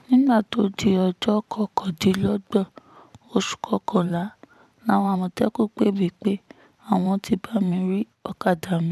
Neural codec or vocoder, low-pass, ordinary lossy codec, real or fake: vocoder, 44.1 kHz, 128 mel bands every 512 samples, BigVGAN v2; 14.4 kHz; none; fake